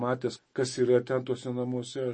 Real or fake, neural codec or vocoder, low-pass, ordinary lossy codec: fake; autoencoder, 48 kHz, 128 numbers a frame, DAC-VAE, trained on Japanese speech; 9.9 kHz; MP3, 32 kbps